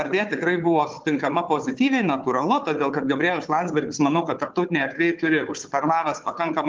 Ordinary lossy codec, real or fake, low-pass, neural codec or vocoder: Opus, 32 kbps; fake; 7.2 kHz; codec, 16 kHz, 4 kbps, FunCodec, trained on Chinese and English, 50 frames a second